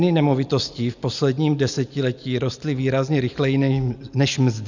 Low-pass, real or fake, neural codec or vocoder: 7.2 kHz; real; none